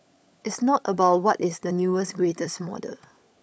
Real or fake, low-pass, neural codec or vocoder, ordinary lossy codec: fake; none; codec, 16 kHz, 16 kbps, FunCodec, trained on LibriTTS, 50 frames a second; none